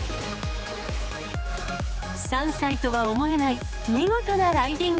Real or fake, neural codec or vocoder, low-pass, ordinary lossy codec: fake; codec, 16 kHz, 4 kbps, X-Codec, HuBERT features, trained on general audio; none; none